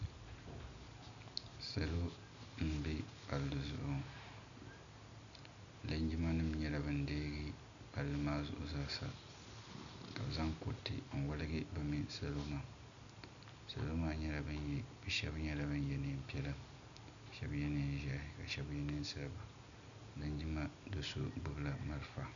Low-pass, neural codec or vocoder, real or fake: 7.2 kHz; none; real